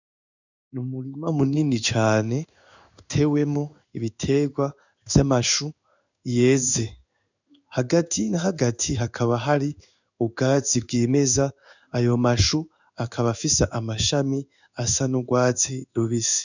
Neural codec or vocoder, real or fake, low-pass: codec, 16 kHz in and 24 kHz out, 1 kbps, XY-Tokenizer; fake; 7.2 kHz